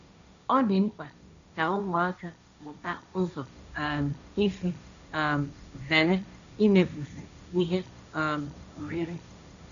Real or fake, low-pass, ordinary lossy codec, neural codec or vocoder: fake; 7.2 kHz; none; codec, 16 kHz, 1.1 kbps, Voila-Tokenizer